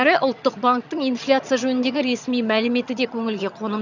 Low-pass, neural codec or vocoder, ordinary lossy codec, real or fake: 7.2 kHz; vocoder, 22.05 kHz, 80 mel bands, HiFi-GAN; none; fake